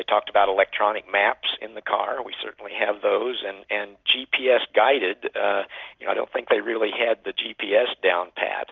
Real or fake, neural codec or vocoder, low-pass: real; none; 7.2 kHz